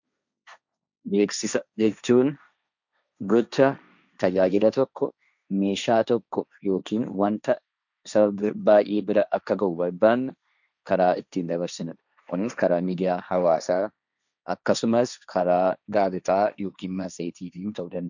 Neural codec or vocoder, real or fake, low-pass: codec, 16 kHz, 1.1 kbps, Voila-Tokenizer; fake; 7.2 kHz